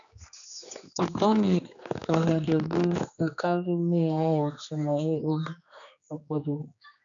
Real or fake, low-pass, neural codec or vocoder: fake; 7.2 kHz; codec, 16 kHz, 2 kbps, X-Codec, HuBERT features, trained on general audio